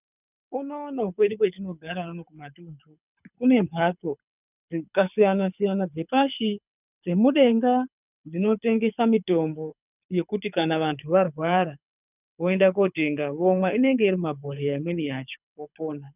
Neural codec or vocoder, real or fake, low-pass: codec, 24 kHz, 6 kbps, HILCodec; fake; 3.6 kHz